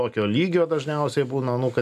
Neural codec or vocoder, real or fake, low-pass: none; real; 14.4 kHz